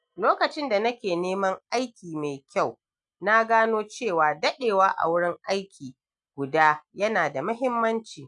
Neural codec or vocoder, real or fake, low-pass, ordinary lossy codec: none; real; 9.9 kHz; none